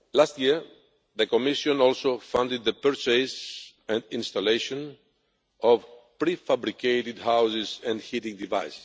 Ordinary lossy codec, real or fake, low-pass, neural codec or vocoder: none; real; none; none